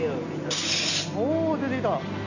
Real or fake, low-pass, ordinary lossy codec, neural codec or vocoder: real; 7.2 kHz; none; none